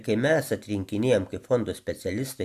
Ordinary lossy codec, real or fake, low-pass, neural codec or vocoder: AAC, 96 kbps; real; 14.4 kHz; none